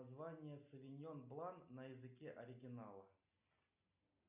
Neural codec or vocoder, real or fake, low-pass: none; real; 3.6 kHz